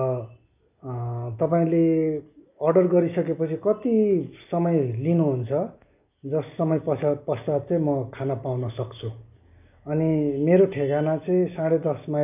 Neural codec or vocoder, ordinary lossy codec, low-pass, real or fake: none; none; 3.6 kHz; real